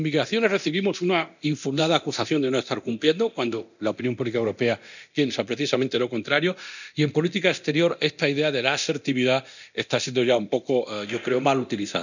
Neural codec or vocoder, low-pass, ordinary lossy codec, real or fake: codec, 24 kHz, 0.9 kbps, DualCodec; 7.2 kHz; none; fake